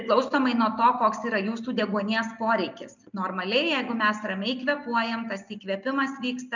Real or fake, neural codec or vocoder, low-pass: real; none; 7.2 kHz